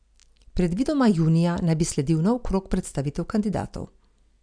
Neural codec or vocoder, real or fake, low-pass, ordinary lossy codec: none; real; 9.9 kHz; none